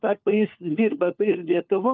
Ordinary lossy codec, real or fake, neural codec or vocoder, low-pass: Opus, 24 kbps; fake; codec, 16 kHz, 4 kbps, FunCodec, trained on LibriTTS, 50 frames a second; 7.2 kHz